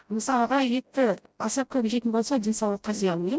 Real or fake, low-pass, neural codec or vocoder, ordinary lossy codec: fake; none; codec, 16 kHz, 0.5 kbps, FreqCodec, smaller model; none